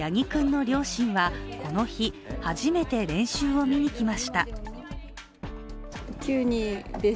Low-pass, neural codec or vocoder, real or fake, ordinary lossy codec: none; none; real; none